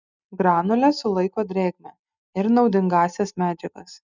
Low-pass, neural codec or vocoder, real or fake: 7.2 kHz; none; real